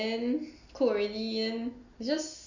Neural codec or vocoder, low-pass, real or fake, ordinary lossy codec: none; 7.2 kHz; real; Opus, 64 kbps